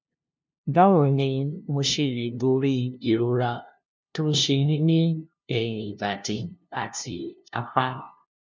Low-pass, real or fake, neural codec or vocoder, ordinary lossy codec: none; fake; codec, 16 kHz, 0.5 kbps, FunCodec, trained on LibriTTS, 25 frames a second; none